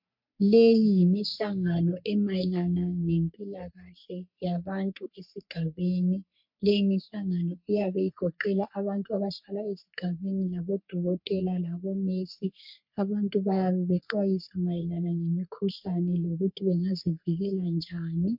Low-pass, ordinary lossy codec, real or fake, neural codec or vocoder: 5.4 kHz; MP3, 48 kbps; fake; codec, 44.1 kHz, 3.4 kbps, Pupu-Codec